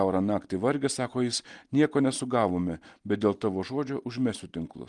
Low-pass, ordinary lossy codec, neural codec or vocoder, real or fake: 10.8 kHz; Opus, 24 kbps; none; real